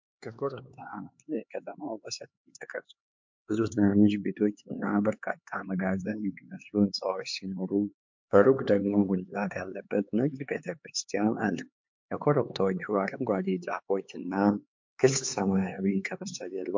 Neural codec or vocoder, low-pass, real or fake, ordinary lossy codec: codec, 16 kHz, 4 kbps, X-Codec, HuBERT features, trained on LibriSpeech; 7.2 kHz; fake; MP3, 48 kbps